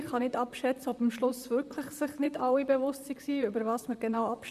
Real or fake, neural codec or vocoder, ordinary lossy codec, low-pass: fake; vocoder, 44.1 kHz, 128 mel bands, Pupu-Vocoder; none; 14.4 kHz